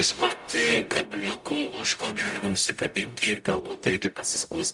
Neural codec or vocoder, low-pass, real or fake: codec, 44.1 kHz, 0.9 kbps, DAC; 10.8 kHz; fake